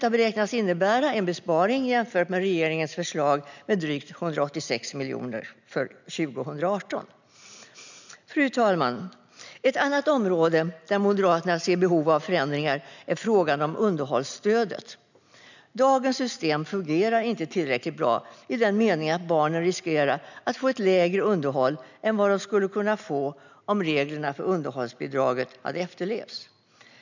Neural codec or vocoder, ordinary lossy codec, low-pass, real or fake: none; none; 7.2 kHz; real